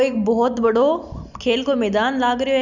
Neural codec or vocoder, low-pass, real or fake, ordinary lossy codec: none; 7.2 kHz; real; none